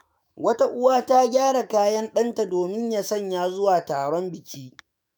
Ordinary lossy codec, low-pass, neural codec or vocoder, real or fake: none; none; autoencoder, 48 kHz, 128 numbers a frame, DAC-VAE, trained on Japanese speech; fake